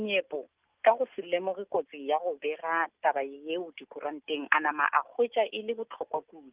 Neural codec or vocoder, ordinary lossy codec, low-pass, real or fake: none; Opus, 24 kbps; 3.6 kHz; real